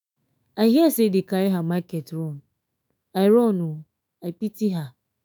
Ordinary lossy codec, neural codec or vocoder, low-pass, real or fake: none; autoencoder, 48 kHz, 128 numbers a frame, DAC-VAE, trained on Japanese speech; none; fake